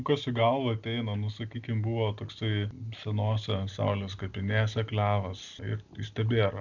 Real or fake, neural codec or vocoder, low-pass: real; none; 7.2 kHz